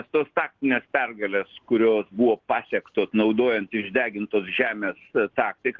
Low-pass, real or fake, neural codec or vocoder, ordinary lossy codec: 7.2 kHz; real; none; Opus, 16 kbps